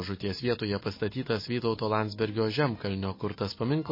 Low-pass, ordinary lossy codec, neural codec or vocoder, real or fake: 5.4 kHz; MP3, 24 kbps; none; real